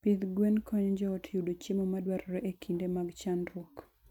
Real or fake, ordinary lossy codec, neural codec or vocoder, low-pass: real; none; none; 19.8 kHz